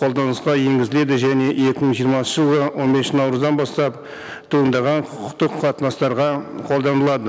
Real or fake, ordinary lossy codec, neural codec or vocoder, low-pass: real; none; none; none